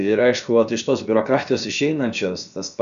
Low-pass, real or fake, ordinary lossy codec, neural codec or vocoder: 7.2 kHz; fake; MP3, 96 kbps; codec, 16 kHz, about 1 kbps, DyCAST, with the encoder's durations